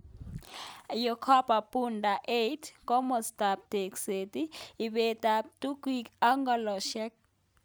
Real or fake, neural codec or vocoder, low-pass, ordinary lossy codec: real; none; none; none